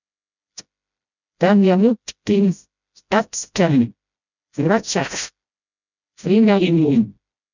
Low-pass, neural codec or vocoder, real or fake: 7.2 kHz; codec, 16 kHz, 0.5 kbps, FreqCodec, smaller model; fake